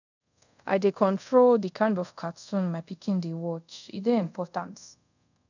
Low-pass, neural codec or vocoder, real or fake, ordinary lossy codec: 7.2 kHz; codec, 24 kHz, 0.5 kbps, DualCodec; fake; none